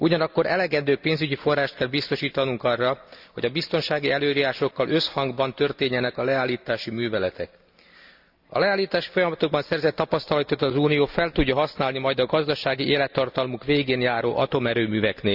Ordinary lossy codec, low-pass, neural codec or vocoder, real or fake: Opus, 64 kbps; 5.4 kHz; none; real